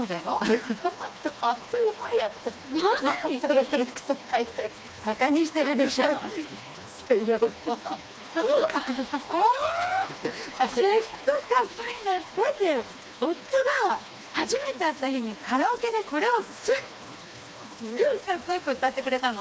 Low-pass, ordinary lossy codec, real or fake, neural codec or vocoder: none; none; fake; codec, 16 kHz, 2 kbps, FreqCodec, smaller model